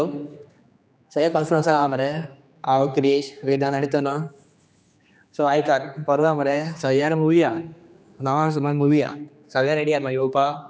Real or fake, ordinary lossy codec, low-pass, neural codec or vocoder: fake; none; none; codec, 16 kHz, 2 kbps, X-Codec, HuBERT features, trained on general audio